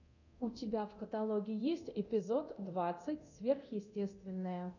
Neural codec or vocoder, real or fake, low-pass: codec, 24 kHz, 0.9 kbps, DualCodec; fake; 7.2 kHz